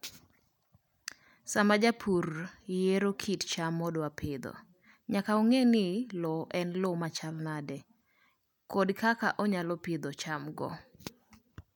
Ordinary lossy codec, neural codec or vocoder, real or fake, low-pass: none; none; real; 19.8 kHz